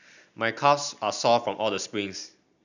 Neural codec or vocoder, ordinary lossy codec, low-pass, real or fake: none; none; 7.2 kHz; real